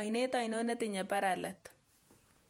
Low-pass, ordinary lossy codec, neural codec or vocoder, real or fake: 19.8 kHz; MP3, 64 kbps; vocoder, 48 kHz, 128 mel bands, Vocos; fake